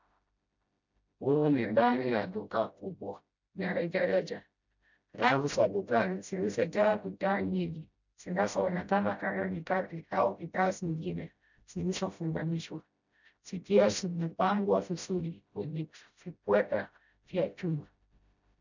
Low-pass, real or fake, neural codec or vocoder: 7.2 kHz; fake; codec, 16 kHz, 0.5 kbps, FreqCodec, smaller model